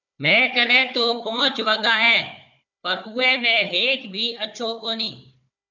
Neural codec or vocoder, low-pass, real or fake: codec, 16 kHz, 4 kbps, FunCodec, trained on Chinese and English, 50 frames a second; 7.2 kHz; fake